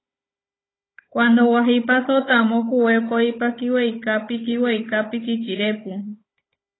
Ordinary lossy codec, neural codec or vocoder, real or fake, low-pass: AAC, 16 kbps; codec, 16 kHz, 16 kbps, FunCodec, trained on Chinese and English, 50 frames a second; fake; 7.2 kHz